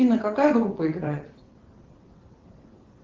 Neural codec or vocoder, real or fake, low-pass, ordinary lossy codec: vocoder, 22.05 kHz, 80 mel bands, WaveNeXt; fake; 7.2 kHz; Opus, 16 kbps